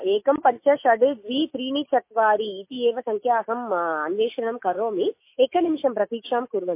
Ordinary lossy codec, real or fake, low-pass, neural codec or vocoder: MP3, 24 kbps; fake; 3.6 kHz; autoencoder, 48 kHz, 128 numbers a frame, DAC-VAE, trained on Japanese speech